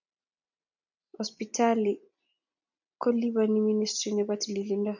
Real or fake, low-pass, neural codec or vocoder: real; 7.2 kHz; none